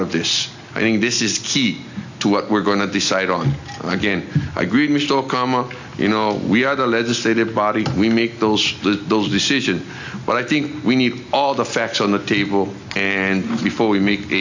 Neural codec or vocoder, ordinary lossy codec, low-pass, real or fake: none; AAC, 48 kbps; 7.2 kHz; real